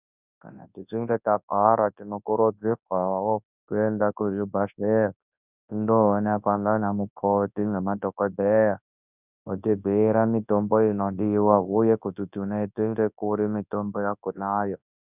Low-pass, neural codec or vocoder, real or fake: 3.6 kHz; codec, 24 kHz, 0.9 kbps, WavTokenizer, large speech release; fake